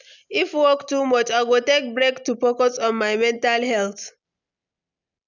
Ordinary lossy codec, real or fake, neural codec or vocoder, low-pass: none; real; none; 7.2 kHz